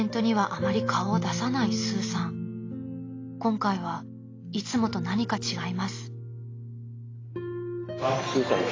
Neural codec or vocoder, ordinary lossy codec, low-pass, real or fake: none; AAC, 32 kbps; 7.2 kHz; real